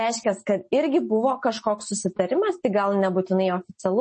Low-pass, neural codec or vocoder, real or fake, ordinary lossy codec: 10.8 kHz; none; real; MP3, 32 kbps